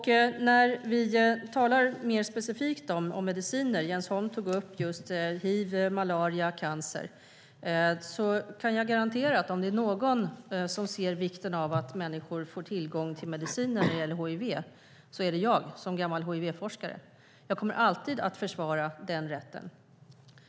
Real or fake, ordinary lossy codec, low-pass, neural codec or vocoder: real; none; none; none